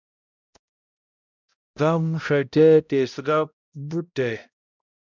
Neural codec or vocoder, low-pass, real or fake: codec, 16 kHz, 0.5 kbps, X-Codec, HuBERT features, trained on balanced general audio; 7.2 kHz; fake